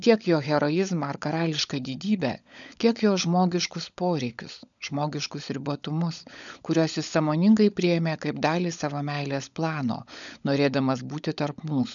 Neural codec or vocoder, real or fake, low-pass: codec, 16 kHz, 16 kbps, FunCodec, trained on LibriTTS, 50 frames a second; fake; 7.2 kHz